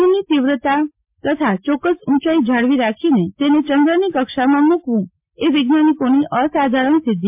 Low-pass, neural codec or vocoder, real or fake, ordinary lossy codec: 3.6 kHz; none; real; MP3, 32 kbps